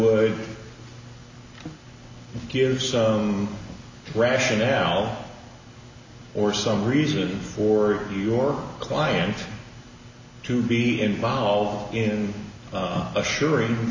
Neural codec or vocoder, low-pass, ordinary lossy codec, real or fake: none; 7.2 kHz; MP3, 64 kbps; real